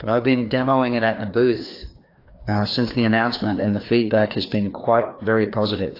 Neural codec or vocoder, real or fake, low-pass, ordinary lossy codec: codec, 16 kHz, 2 kbps, FreqCodec, larger model; fake; 5.4 kHz; AAC, 32 kbps